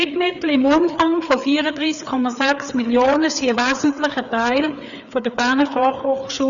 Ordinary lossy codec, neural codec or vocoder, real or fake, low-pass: none; codec, 16 kHz, 4 kbps, FreqCodec, larger model; fake; 7.2 kHz